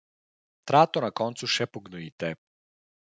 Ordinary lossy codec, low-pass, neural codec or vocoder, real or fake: none; none; none; real